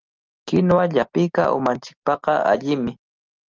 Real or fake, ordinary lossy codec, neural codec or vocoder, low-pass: real; Opus, 32 kbps; none; 7.2 kHz